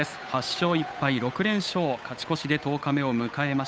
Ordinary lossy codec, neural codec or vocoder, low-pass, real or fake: none; codec, 16 kHz, 8 kbps, FunCodec, trained on Chinese and English, 25 frames a second; none; fake